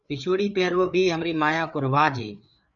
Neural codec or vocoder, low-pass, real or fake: codec, 16 kHz, 4 kbps, FreqCodec, larger model; 7.2 kHz; fake